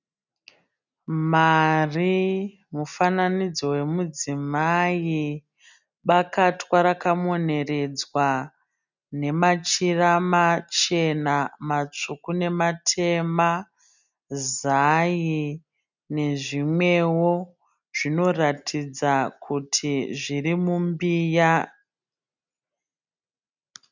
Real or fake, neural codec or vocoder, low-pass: real; none; 7.2 kHz